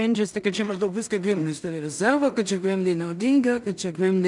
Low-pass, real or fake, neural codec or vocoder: 10.8 kHz; fake; codec, 16 kHz in and 24 kHz out, 0.4 kbps, LongCat-Audio-Codec, two codebook decoder